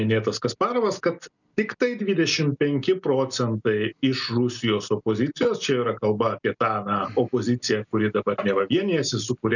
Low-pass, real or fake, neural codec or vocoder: 7.2 kHz; real; none